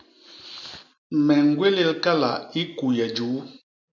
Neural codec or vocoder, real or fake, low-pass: none; real; 7.2 kHz